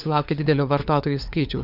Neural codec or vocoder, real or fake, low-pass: codec, 16 kHz, 4 kbps, FunCodec, trained on LibriTTS, 50 frames a second; fake; 5.4 kHz